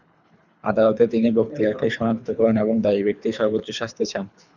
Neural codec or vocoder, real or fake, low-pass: codec, 24 kHz, 3 kbps, HILCodec; fake; 7.2 kHz